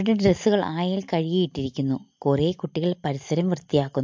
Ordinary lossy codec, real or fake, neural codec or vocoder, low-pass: MP3, 48 kbps; real; none; 7.2 kHz